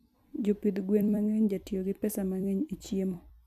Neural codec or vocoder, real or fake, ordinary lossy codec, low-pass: vocoder, 44.1 kHz, 128 mel bands every 256 samples, BigVGAN v2; fake; none; 14.4 kHz